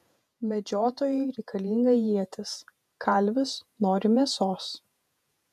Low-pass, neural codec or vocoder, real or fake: 14.4 kHz; vocoder, 48 kHz, 128 mel bands, Vocos; fake